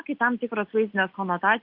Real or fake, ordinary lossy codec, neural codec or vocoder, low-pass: real; Opus, 32 kbps; none; 5.4 kHz